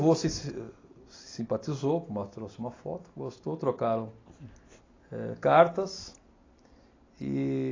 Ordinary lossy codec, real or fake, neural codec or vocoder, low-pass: AAC, 32 kbps; real; none; 7.2 kHz